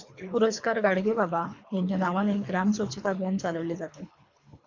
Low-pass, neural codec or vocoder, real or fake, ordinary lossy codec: 7.2 kHz; codec, 24 kHz, 3 kbps, HILCodec; fake; MP3, 64 kbps